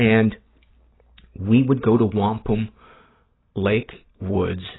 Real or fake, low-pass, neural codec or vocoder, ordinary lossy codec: real; 7.2 kHz; none; AAC, 16 kbps